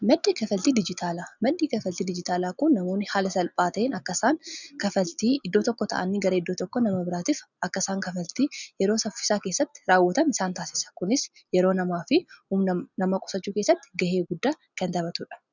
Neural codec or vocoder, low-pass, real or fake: none; 7.2 kHz; real